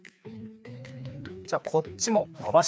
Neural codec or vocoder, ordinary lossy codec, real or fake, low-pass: codec, 16 kHz, 2 kbps, FreqCodec, larger model; none; fake; none